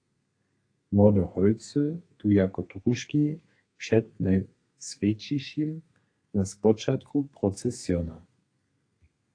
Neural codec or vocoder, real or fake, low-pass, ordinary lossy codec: codec, 32 kHz, 1.9 kbps, SNAC; fake; 9.9 kHz; AAC, 48 kbps